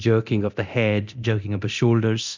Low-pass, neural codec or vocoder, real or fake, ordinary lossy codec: 7.2 kHz; codec, 24 kHz, 0.9 kbps, DualCodec; fake; MP3, 64 kbps